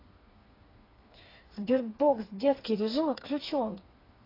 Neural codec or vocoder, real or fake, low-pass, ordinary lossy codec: codec, 16 kHz, 4 kbps, FreqCodec, smaller model; fake; 5.4 kHz; AAC, 24 kbps